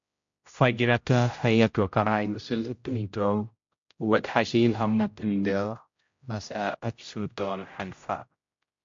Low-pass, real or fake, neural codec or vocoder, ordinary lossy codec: 7.2 kHz; fake; codec, 16 kHz, 0.5 kbps, X-Codec, HuBERT features, trained on general audio; MP3, 48 kbps